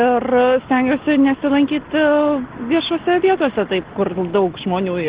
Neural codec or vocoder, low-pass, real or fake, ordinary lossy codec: none; 3.6 kHz; real; Opus, 16 kbps